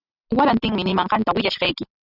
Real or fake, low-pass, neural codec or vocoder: real; 5.4 kHz; none